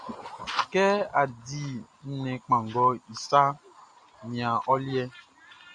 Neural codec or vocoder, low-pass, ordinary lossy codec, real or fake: none; 9.9 kHz; Opus, 64 kbps; real